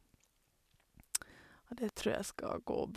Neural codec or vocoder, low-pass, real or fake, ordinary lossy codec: none; 14.4 kHz; real; none